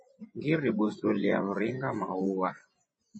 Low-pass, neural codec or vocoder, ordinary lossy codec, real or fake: 10.8 kHz; vocoder, 24 kHz, 100 mel bands, Vocos; MP3, 32 kbps; fake